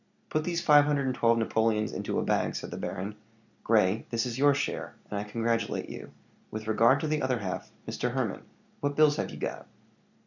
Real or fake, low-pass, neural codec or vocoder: real; 7.2 kHz; none